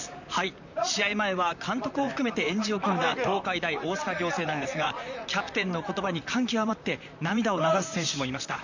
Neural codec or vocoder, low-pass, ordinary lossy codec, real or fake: vocoder, 44.1 kHz, 128 mel bands, Pupu-Vocoder; 7.2 kHz; none; fake